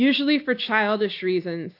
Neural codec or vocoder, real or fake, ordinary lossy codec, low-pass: none; real; AAC, 32 kbps; 5.4 kHz